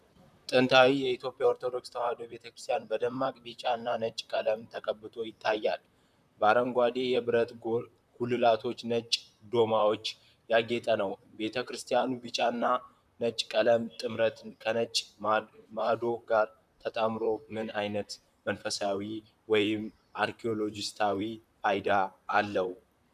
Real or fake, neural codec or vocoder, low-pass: fake; vocoder, 44.1 kHz, 128 mel bands, Pupu-Vocoder; 14.4 kHz